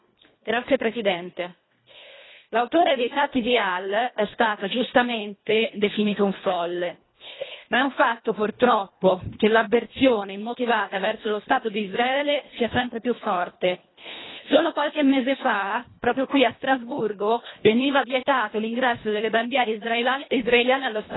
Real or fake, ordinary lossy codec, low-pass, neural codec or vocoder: fake; AAC, 16 kbps; 7.2 kHz; codec, 24 kHz, 1.5 kbps, HILCodec